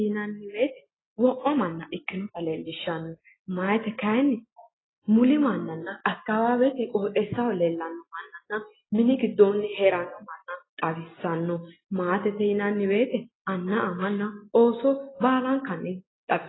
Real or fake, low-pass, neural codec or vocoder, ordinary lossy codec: real; 7.2 kHz; none; AAC, 16 kbps